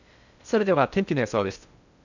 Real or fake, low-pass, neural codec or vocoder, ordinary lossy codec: fake; 7.2 kHz; codec, 16 kHz in and 24 kHz out, 0.6 kbps, FocalCodec, streaming, 2048 codes; none